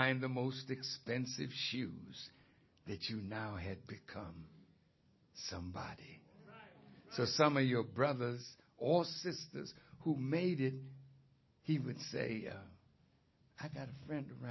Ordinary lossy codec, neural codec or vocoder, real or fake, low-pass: MP3, 24 kbps; none; real; 7.2 kHz